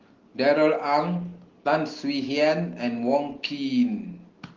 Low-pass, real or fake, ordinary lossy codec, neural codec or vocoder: 7.2 kHz; real; Opus, 16 kbps; none